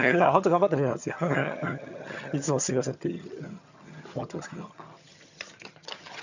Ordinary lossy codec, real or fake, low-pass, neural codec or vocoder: none; fake; 7.2 kHz; vocoder, 22.05 kHz, 80 mel bands, HiFi-GAN